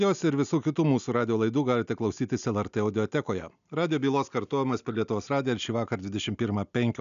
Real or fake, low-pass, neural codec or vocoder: real; 7.2 kHz; none